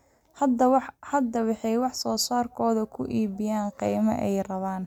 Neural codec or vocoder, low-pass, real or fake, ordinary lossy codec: none; 19.8 kHz; real; none